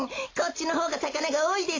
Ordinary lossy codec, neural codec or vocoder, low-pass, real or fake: AAC, 32 kbps; none; 7.2 kHz; real